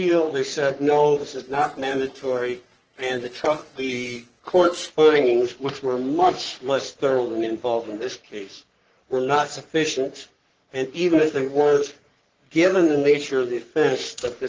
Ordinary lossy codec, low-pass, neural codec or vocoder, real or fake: Opus, 16 kbps; 7.2 kHz; codec, 44.1 kHz, 3.4 kbps, Pupu-Codec; fake